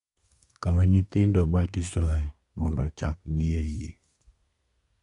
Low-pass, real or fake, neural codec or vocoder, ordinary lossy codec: 10.8 kHz; fake; codec, 24 kHz, 1 kbps, SNAC; none